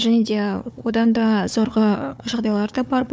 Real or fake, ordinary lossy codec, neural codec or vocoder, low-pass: fake; none; codec, 16 kHz, 4 kbps, FunCodec, trained on Chinese and English, 50 frames a second; none